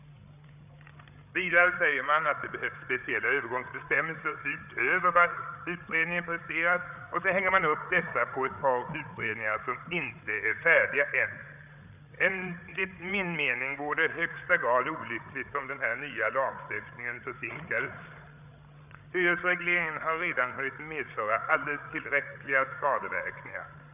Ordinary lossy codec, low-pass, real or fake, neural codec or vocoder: none; 3.6 kHz; fake; codec, 16 kHz, 8 kbps, FreqCodec, larger model